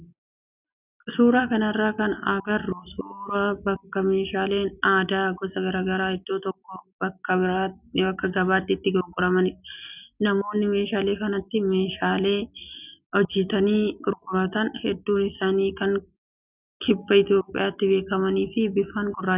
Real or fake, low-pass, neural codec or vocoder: real; 3.6 kHz; none